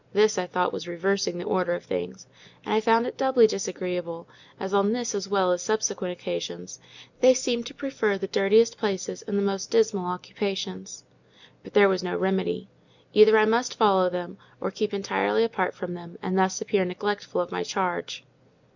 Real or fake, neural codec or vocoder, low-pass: real; none; 7.2 kHz